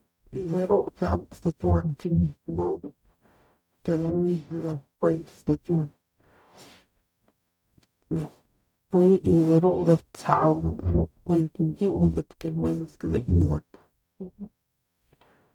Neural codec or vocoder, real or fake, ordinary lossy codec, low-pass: codec, 44.1 kHz, 0.9 kbps, DAC; fake; none; 19.8 kHz